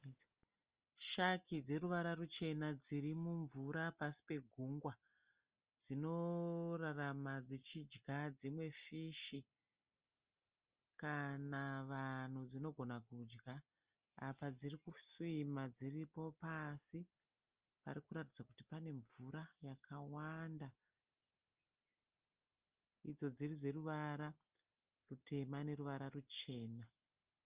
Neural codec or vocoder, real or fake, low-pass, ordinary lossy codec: none; real; 3.6 kHz; Opus, 24 kbps